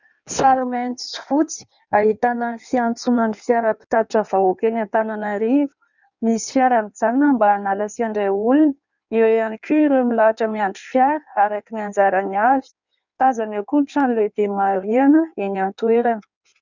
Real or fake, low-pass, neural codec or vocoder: fake; 7.2 kHz; codec, 16 kHz in and 24 kHz out, 1.1 kbps, FireRedTTS-2 codec